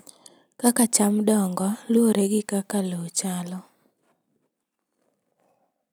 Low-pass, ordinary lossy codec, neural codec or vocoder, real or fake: none; none; none; real